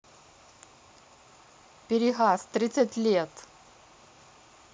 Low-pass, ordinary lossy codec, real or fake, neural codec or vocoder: none; none; real; none